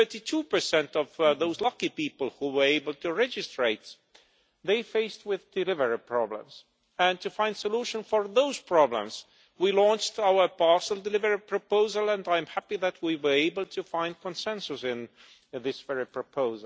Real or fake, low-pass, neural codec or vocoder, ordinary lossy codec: real; none; none; none